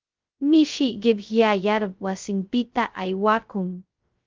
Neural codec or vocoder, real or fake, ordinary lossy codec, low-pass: codec, 16 kHz, 0.2 kbps, FocalCodec; fake; Opus, 32 kbps; 7.2 kHz